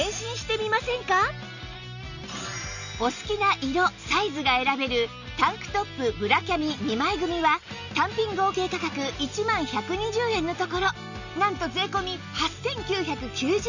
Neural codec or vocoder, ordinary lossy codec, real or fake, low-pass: none; none; real; 7.2 kHz